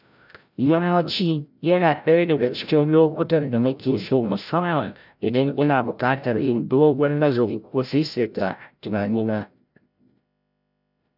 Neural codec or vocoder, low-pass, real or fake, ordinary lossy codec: codec, 16 kHz, 0.5 kbps, FreqCodec, larger model; 5.4 kHz; fake; none